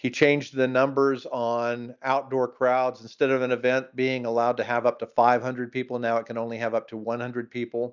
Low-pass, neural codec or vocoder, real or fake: 7.2 kHz; none; real